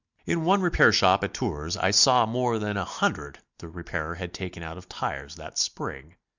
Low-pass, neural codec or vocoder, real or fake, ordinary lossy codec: 7.2 kHz; none; real; Opus, 64 kbps